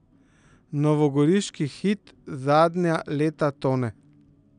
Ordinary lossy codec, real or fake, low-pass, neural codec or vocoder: none; real; 9.9 kHz; none